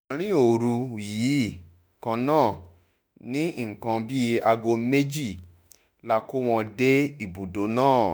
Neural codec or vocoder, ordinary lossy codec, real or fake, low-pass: autoencoder, 48 kHz, 32 numbers a frame, DAC-VAE, trained on Japanese speech; none; fake; none